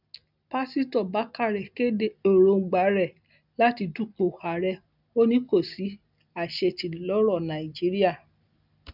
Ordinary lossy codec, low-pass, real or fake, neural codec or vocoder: none; 5.4 kHz; real; none